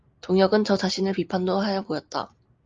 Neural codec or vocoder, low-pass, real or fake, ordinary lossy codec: none; 7.2 kHz; real; Opus, 24 kbps